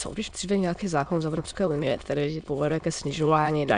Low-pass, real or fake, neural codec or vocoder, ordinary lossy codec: 9.9 kHz; fake; autoencoder, 22.05 kHz, a latent of 192 numbers a frame, VITS, trained on many speakers; MP3, 96 kbps